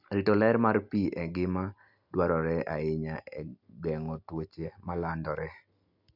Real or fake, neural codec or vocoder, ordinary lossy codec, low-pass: real; none; none; 5.4 kHz